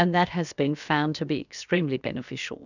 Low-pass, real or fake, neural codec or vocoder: 7.2 kHz; fake; codec, 16 kHz, about 1 kbps, DyCAST, with the encoder's durations